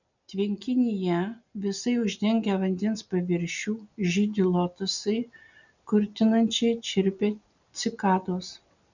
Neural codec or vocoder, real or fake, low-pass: none; real; 7.2 kHz